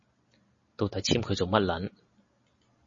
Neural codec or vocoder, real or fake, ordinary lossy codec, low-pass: none; real; MP3, 32 kbps; 7.2 kHz